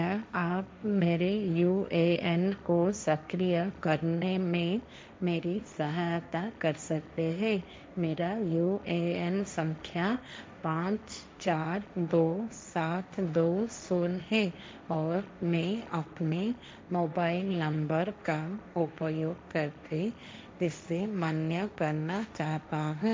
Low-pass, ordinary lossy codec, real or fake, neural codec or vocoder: none; none; fake; codec, 16 kHz, 1.1 kbps, Voila-Tokenizer